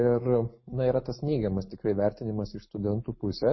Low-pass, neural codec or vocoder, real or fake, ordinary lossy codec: 7.2 kHz; none; real; MP3, 24 kbps